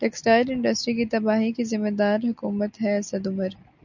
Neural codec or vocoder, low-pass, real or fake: none; 7.2 kHz; real